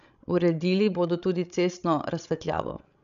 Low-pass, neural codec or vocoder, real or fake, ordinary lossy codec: 7.2 kHz; codec, 16 kHz, 16 kbps, FreqCodec, larger model; fake; none